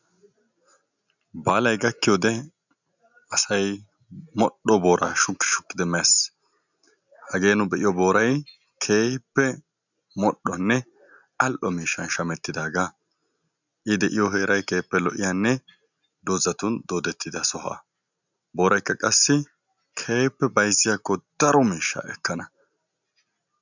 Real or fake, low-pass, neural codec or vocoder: real; 7.2 kHz; none